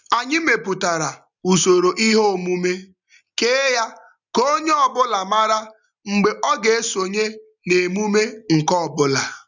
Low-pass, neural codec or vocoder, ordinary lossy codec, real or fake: 7.2 kHz; none; none; real